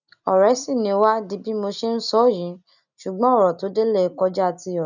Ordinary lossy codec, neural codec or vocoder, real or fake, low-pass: none; none; real; 7.2 kHz